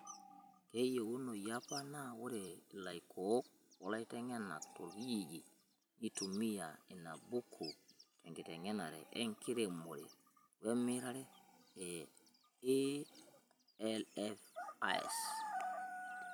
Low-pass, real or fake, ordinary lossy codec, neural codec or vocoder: none; fake; none; vocoder, 44.1 kHz, 128 mel bands every 256 samples, BigVGAN v2